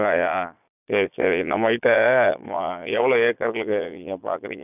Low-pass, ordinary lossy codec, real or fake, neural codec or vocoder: 3.6 kHz; none; fake; vocoder, 22.05 kHz, 80 mel bands, Vocos